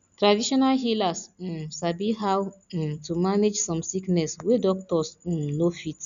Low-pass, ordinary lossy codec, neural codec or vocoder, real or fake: 7.2 kHz; none; none; real